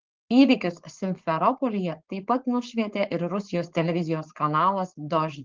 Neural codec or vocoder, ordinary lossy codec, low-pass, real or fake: codec, 16 kHz, 4.8 kbps, FACodec; Opus, 16 kbps; 7.2 kHz; fake